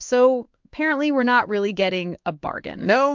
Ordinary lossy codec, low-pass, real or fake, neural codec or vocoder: MP3, 64 kbps; 7.2 kHz; fake; codec, 16 kHz in and 24 kHz out, 1 kbps, XY-Tokenizer